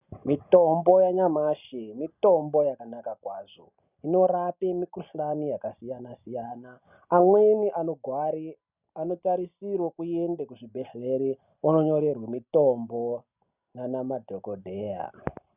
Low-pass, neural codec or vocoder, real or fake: 3.6 kHz; none; real